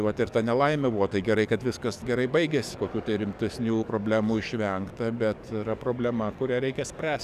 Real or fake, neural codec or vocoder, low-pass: fake; codec, 44.1 kHz, 7.8 kbps, DAC; 14.4 kHz